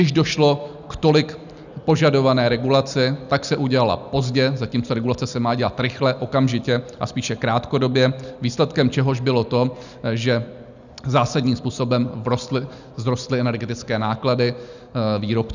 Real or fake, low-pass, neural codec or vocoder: real; 7.2 kHz; none